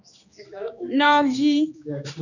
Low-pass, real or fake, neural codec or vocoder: 7.2 kHz; fake; codec, 16 kHz, 2 kbps, X-Codec, HuBERT features, trained on general audio